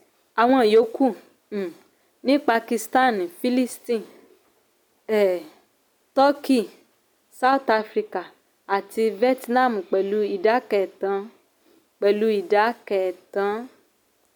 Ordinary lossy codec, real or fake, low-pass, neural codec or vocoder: none; real; none; none